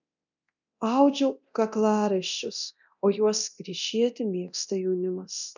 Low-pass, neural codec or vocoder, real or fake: 7.2 kHz; codec, 24 kHz, 0.9 kbps, DualCodec; fake